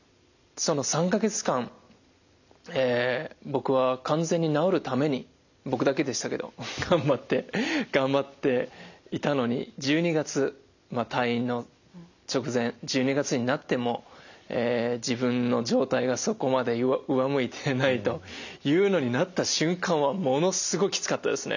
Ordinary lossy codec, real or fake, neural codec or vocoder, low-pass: none; real; none; 7.2 kHz